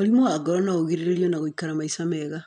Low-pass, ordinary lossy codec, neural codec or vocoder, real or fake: 9.9 kHz; none; none; real